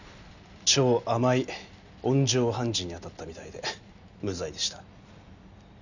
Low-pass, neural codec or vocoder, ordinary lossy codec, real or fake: 7.2 kHz; none; none; real